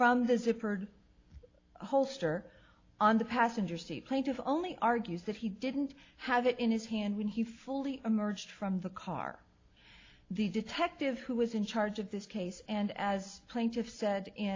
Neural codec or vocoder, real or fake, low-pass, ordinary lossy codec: none; real; 7.2 kHz; AAC, 32 kbps